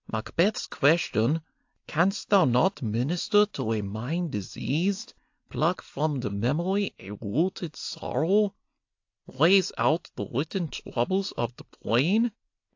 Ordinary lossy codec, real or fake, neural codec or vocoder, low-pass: AAC, 48 kbps; real; none; 7.2 kHz